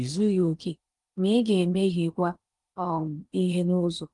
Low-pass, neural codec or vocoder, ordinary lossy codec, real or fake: 10.8 kHz; codec, 16 kHz in and 24 kHz out, 0.8 kbps, FocalCodec, streaming, 65536 codes; Opus, 24 kbps; fake